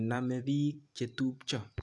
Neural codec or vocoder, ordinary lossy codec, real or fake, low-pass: vocoder, 44.1 kHz, 128 mel bands every 256 samples, BigVGAN v2; AAC, 64 kbps; fake; 10.8 kHz